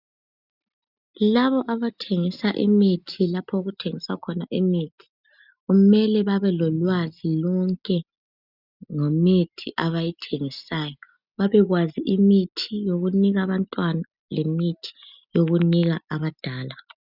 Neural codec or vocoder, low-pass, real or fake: none; 5.4 kHz; real